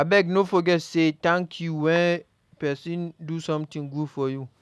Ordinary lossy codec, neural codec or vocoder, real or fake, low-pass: none; none; real; none